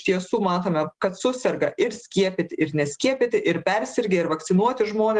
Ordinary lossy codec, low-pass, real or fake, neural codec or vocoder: Opus, 24 kbps; 10.8 kHz; real; none